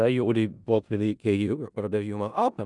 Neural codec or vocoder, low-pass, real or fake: codec, 16 kHz in and 24 kHz out, 0.4 kbps, LongCat-Audio-Codec, four codebook decoder; 10.8 kHz; fake